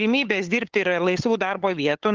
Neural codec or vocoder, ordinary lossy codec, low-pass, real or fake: codec, 16 kHz, 8 kbps, FunCodec, trained on LibriTTS, 25 frames a second; Opus, 16 kbps; 7.2 kHz; fake